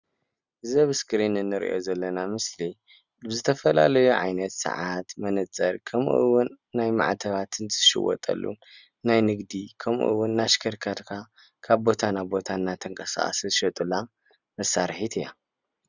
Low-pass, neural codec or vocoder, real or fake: 7.2 kHz; none; real